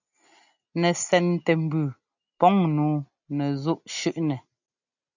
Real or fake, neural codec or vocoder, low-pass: real; none; 7.2 kHz